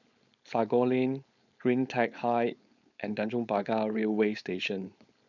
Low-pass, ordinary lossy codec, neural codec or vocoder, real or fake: 7.2 kHz; none; codec, 16 kHz, 4.8 kbps, FACodec; fake